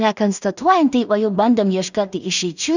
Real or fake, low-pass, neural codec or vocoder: fake; 7.2 kHz; codec, 16 kHz in and 24 kHz out, 0.4 kbps, LongCat-Audio-Codec, two codebook decoder